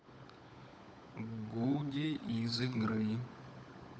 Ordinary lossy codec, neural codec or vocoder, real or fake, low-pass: none; codec, 16 kHz, 16 kbps, FunCodec, trained on LibriTTS, 50 frames a second; fake; none